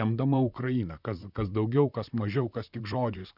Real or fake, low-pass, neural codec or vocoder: fake; 5.4 kHz; vocoder, 44.1 kHz, 128 mel bands, Pupu-Vocoder